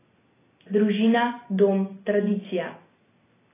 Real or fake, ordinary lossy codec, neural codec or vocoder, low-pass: real; AAC, 16 kbps; none; 3.6 kHz